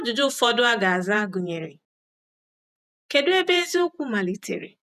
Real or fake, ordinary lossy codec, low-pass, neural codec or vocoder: fake; none; 14.4 kHz; vocoder, 48 kHz, 128 mel bands, Vocos